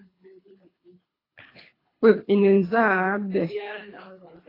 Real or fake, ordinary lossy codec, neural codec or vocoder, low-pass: fake; AAC, 24 kbps; codec, 24 kHz, 3 kbps, HILCodec; 5.4 kHz